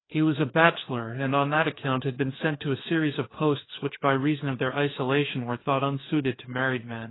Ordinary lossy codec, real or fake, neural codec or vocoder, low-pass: AAC, 16 kbps; fake; codec, 16 kHz, 2 kbps, FreqCodec, larger model; 7.2 kHz